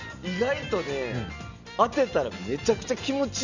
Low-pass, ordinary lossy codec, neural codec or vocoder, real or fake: 7.2 kHz; none; none; real